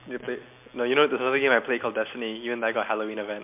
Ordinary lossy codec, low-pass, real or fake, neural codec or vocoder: MP3, 32 kbps; 3.6 kHz; real; none